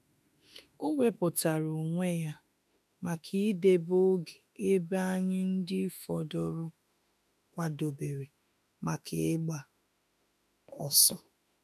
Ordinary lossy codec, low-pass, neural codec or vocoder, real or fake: none; 14.4 kHz; autoencoder, 48 kHz, 32 numbers a frame, DAC-VAE, trained on Japanese speech; fake